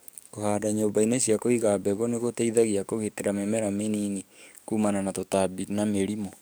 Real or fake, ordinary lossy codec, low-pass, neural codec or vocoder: fake; none; none; codec, 44.1 kHz, 7.8 kbps, DAC